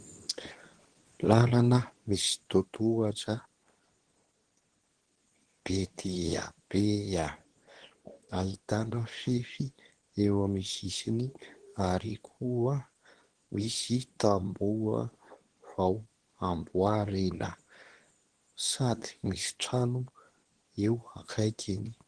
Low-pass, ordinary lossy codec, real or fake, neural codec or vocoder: 9.9 kHz; Opus, 16 kbps; fake; codec, 24 kHz, 0.9 kbps, WavTokenizer, medium speech release version 2